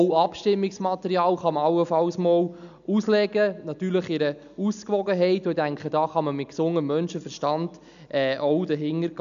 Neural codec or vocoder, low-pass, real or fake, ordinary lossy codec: none; 7.2 kHz; real; none